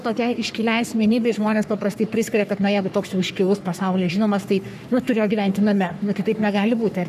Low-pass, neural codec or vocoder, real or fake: 14.4 kHz; codec, 44.1 kHz, 3.4 kbps, Pupu-Codec; fake